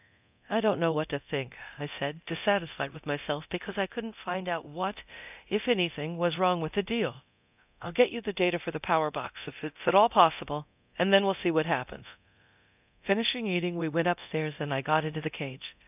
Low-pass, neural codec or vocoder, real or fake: 3.6 kHz; codec, 24 kHz, 0.9 kbps, DualCodec; fake